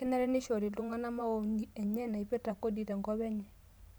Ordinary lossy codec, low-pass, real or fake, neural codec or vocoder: none; none; fake; vocoder, 44.1 kHz, 128 mel bands every 512 samples, BigVGAN v2